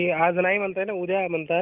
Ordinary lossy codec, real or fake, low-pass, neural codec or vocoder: Opus, 24 kbps; real; 3.6 kHz; none